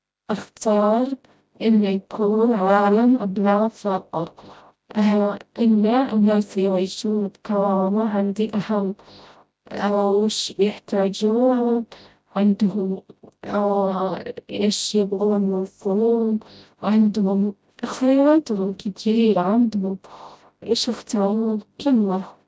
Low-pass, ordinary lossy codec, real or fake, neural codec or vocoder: none; none; fake; codec, 16 kHz, 0.5 kbps, FreqCodec, smaller model